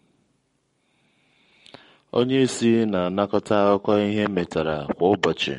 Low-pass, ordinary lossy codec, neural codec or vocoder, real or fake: 19.8 kHz; MP3, 48 kbps; codec, 44.1 kHz, 7.8 kbps, Pupu-Codec; fake